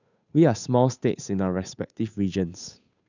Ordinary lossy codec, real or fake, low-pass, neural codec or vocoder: none; fake; 7.2 kHz; codec, 16 kHz, 8 kbps, FunCodec, trained on Chinese and English, 25 frames a second